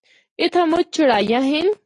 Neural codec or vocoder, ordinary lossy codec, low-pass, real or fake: none; AAC, 32 kbps; 10.8 kHz; real